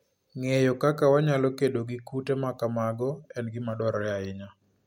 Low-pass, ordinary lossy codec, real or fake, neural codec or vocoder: 19.8 kHz; MP3, 64 kbps; real; none